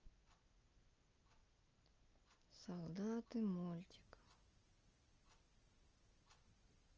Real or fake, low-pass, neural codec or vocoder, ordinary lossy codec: real; 7.2 kHz; none; Opus, 24 kbps